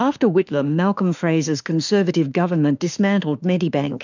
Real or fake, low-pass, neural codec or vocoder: fake; 7.2 kHz; autoencoder, 48 kHz, 32 numbers a frame, DAC-VAE, trained on Japanese speech